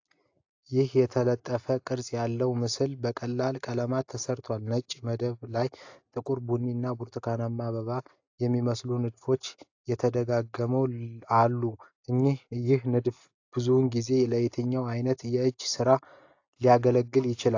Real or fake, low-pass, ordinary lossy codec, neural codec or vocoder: real; 7.2 kHz; AAC, 48 kbps; none